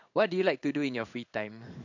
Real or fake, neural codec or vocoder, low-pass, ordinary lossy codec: fake; codec, 16 kHz in and 24 kHz out, 1 kbps, XY-Tokenizer; 7.2 kHz; none